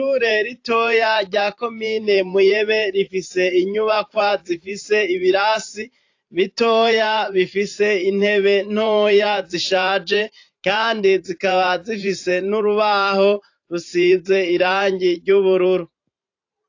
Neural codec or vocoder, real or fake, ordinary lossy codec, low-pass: vocoder, 44.1 kHz, 128 mel bands every 512 samples, BigVGAN v2; fake; AAC, 48 kbps; 7.2 kHz